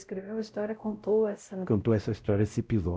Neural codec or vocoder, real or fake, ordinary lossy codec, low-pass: codec, 16 kHz, 0.5 kbps, X-Codec, WavLM features, trained on Multilingual LibriSpeech; fake; none; none